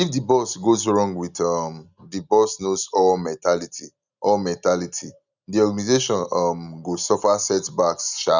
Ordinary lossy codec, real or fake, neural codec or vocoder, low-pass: none; real; none; 7.2 kHz